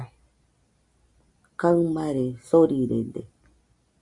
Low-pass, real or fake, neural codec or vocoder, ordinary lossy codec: 10.8 kHz; real; none; MP3, 96 kbps